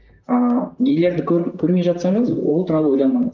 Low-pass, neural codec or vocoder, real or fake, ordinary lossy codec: 7.2 kHz; vocoder, 44.1 kHz, 128 mel bands, Pupu-Vocoder; fake; Opus, 24 kbps